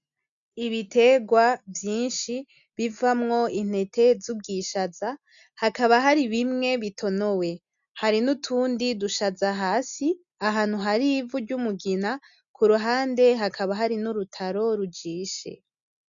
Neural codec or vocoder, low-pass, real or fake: none; 7.2 kHz; real